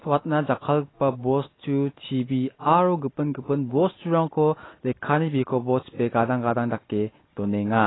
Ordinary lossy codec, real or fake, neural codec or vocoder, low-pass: AAC, 16 kbps; real; none; 7.2 kHz